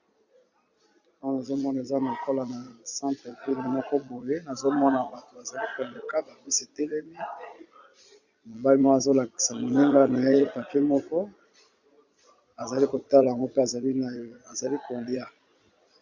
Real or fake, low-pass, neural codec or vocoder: fake; 7.2 kHz; vocoder, 22.05 kHz, 80 mel bands, Vocos